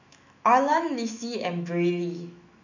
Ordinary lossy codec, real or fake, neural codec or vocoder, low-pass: none; fake; autoencoder, 48 kHz, 128 numbers a frame, DAC-VAE, trained on Japanese speech; 7.2 kHz